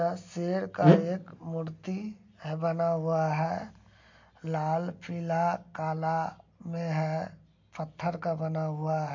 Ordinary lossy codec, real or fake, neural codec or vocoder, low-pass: MP3, 48 kbps; real; none; 7.2 kHz